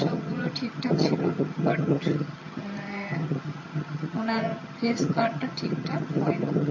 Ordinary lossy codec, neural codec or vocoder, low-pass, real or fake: MP3, 32 kbps; vocoder, 22.05 kHz, 80 mel bands, HiFi-GAN; 7.2 kHz; fake